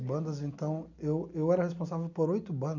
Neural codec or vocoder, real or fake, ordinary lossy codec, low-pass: none; real; none; 7.2 kHz